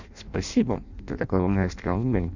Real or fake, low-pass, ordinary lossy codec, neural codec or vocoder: fake; 7.2 kHz; none; codec, 16 kHz in and 24 kHz out, 0.6 kbps, FireRedTTS-2 codec